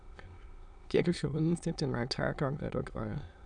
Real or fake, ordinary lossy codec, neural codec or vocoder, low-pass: fake; none; autoencoder, 22.05 kHz, a latent of 192 numbers a frame, VITS, trained on many speakers; 9.9 kHz